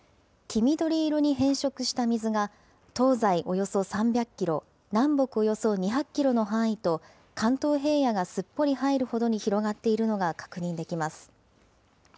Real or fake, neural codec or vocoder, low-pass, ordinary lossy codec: real; none; none; none